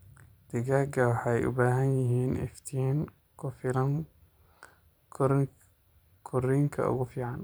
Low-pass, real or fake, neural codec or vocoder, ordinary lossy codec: none; fake; vocoder, 44.1 kHz, 128 mel bands every 512 samples, BigVGAN v2; none